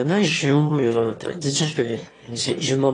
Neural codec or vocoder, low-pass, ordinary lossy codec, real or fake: autoencoder, 22.05 kHz, a latent of 192 numbers a frame, VITS, trained on one speaker; 9.9 kHz; AAC, 32 kbps; fake